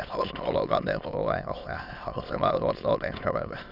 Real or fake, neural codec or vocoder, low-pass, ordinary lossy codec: fake; autoencoder, 22.05 kHz, a latent of 192 numbers a frame, VITS, trained on many speakers; 5.4 kHz; none